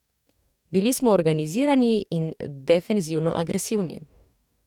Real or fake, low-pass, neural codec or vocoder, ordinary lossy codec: fake; 19.8 kHz; codec, 44.1 kHz, 2.6 kbps, DAC; none